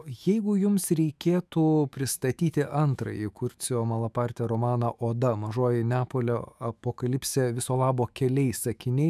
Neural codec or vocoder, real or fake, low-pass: autoencoder, 48 kHz, 128 numbers a frame, DAC-VAE, trained on Japanese speech; fake; 14.4 kHz